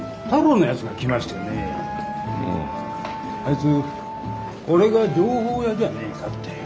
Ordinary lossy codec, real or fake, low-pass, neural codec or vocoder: none; real; none; none